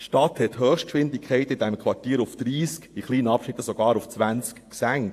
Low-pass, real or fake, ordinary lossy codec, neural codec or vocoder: 14.4 kHz; fake; AAC, 64 kbps; vocoder, 48 kHz, 128 mel bands, Vocos